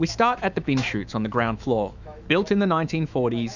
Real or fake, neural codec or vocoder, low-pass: fake; autoencoder, 48 kHz, 128 numbers a frame, DAC-VAE, trained on Japanese speech; 7.2 kHz